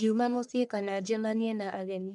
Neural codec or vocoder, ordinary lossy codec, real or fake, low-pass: codec, 44.1 kHz, 1.7 kbps, Pupu-Codec; none; fake; 10.8 kHz